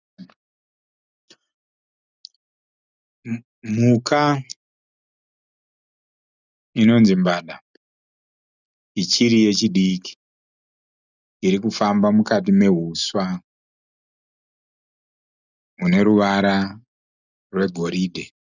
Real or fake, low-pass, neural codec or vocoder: real; 7.2 kHz; none